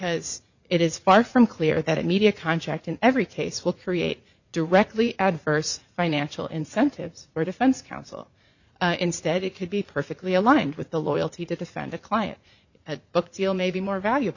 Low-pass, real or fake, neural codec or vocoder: 7.2 kHz; fake; vocoder, 44.1 kHz, 80 mel bands, Vocos